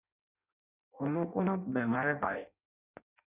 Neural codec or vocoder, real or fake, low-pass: codec, 16 kHz in and 24 kHz out, 0.6 kbps, FireRedTTS-2 codec; fake; 3.6 kHz